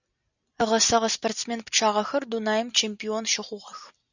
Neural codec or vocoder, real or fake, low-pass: none; real; 7.2 kHz